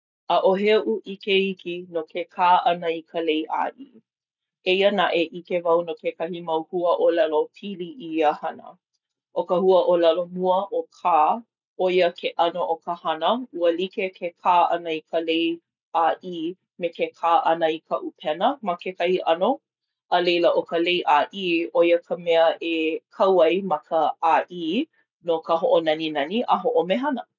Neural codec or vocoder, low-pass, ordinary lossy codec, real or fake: none; 7.2 kHz; none; real